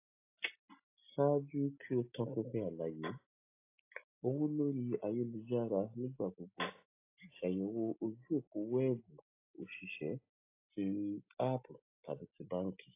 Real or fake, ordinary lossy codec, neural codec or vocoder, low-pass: real; AAC, 24 kbps; none; 3.6 kHz